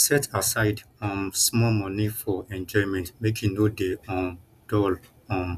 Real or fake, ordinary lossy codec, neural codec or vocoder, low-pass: real; none; none; 14.4 kHz